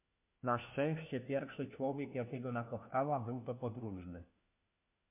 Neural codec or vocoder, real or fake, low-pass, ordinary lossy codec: codec, 16 kHz, 1 kbps, FunCodec, trained on LibriTTS, 50 frames a second; fake; 3.6 kHz; MP3, 32 kbps